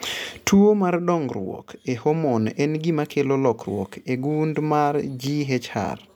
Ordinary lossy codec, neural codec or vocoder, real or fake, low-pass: none; none; real; 19.8 kHz